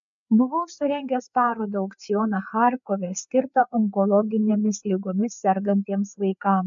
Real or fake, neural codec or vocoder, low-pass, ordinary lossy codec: fake; codec, 16 kHz, 4 kbps, FreqCodec, larger model; 7.2 kHz; MP3, 64 kbps